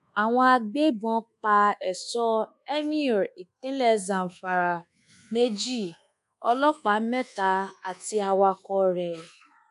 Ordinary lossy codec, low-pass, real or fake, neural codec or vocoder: MP3, 96 kbps; 10.8 kHz; fake; codec, 24 kHz, 1.2 kbps, DualCodec